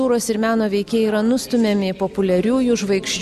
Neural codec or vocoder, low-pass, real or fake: none; 14.4 kHz; real